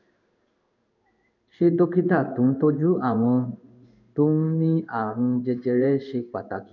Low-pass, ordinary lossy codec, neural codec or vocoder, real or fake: 7.2 kHz; none; codec, 16 kHz in and 24 kHz out, 1 kbps, XY-Tokenizer; fake